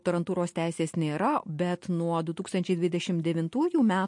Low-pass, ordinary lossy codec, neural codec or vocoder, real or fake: 10.8 kHz; MP3, 48 kbps; none; real